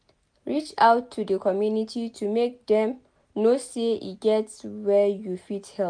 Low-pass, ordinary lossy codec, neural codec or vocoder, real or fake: 9.9 kHz; MP3, 64 kbps; none; real